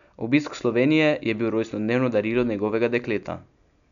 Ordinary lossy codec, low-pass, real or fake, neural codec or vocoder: none; 7.2 kHz; real; none